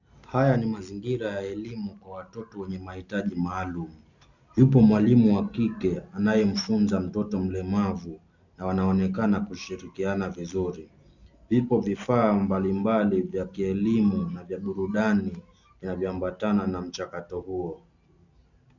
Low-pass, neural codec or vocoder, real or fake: 7.2 kHz; none; real